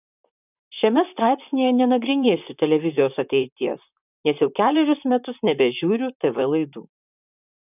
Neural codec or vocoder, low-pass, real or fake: vocoder, 44.1 kHz, 128 mel bands, Pupu-Vocoder; 3.6 kHz; fake